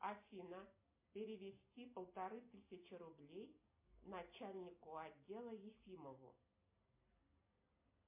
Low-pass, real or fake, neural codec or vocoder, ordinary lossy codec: 3.6 kHz; real; none; MP3, 24 kbps